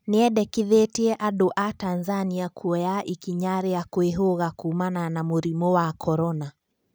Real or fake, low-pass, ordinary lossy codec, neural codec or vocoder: real; none; none; none